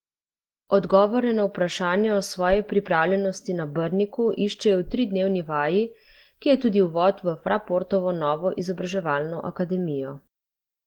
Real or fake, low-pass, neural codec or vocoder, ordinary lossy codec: real; 19.8 kHz; none; Opus, 16 kbps